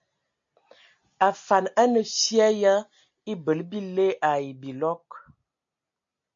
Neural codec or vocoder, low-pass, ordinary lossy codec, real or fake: none; 7.2 kHz; MP3, 48 kbps; real